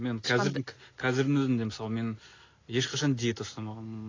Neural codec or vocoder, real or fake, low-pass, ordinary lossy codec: none; real; 7.2 kHz; AAC, 32 kbps